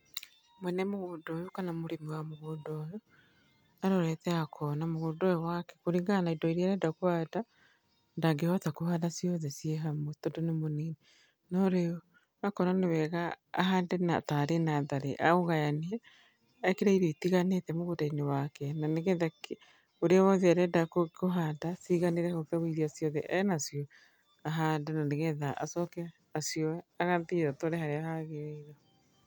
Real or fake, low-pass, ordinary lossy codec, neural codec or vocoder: real; none; none; none